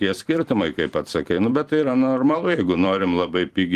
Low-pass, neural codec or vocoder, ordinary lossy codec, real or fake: 14.4 kHz; vocoder, 44.1 kHz, 128 mel bands every 256 samples, BigVGAN v2; Opus, 24 kbps; fake